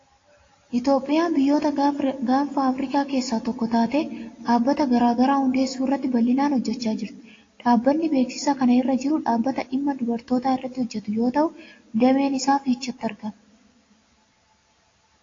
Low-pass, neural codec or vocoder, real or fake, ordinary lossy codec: 7.2 kHz; none; real; AAC, 32 kbps